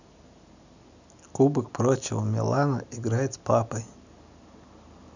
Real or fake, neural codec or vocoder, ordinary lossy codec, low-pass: real; none; none; 7.2 kHz